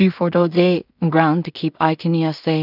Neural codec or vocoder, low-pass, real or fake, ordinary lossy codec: codec, 16 kHz in and 24 kHz out, 0.4 kbps, LongCat-Audio-Codec, two codebook decoder; 5.4 kHz; fake; none